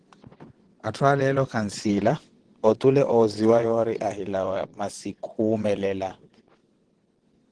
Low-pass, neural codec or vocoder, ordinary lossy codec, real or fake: 9.9 kHz; vocoder, 22.05 kHz, 80 mel bands, WaveNeXt; Opus, 16 kbps; fake